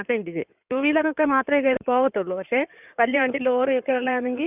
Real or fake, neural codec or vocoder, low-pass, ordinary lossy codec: fake; codec, 16 kHz in and 24 kHz out, 2.2 kbps, FireRedTTS-2 codec; 3.6 kHz; none